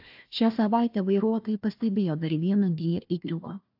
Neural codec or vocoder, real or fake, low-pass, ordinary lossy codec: codec, 16 kHz, 1 kbps, FunCodec, trained on Chinese and English, 50 frames a second; fake; 5.4 kHz; AAC, 48 kbps